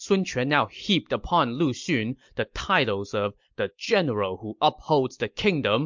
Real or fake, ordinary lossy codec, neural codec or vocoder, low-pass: real; MP3, 64 kbps; none; 7.2 kHz